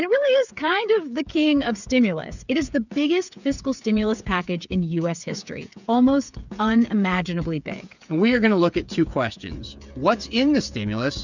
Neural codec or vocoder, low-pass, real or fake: codec, 16 kHz, 8 kbps, FreqCodec, smaller model; 7.2 kHz; fake